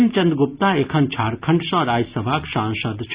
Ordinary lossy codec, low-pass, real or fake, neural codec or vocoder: Opus, 64 kbps; 3.6 kHz; real; none